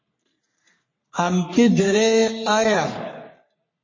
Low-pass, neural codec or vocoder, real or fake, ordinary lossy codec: 7.2 kHz; codec, 44.1 kHz, 3.4 kbps, Pupu-Codec; fake; MP3, 32 kbps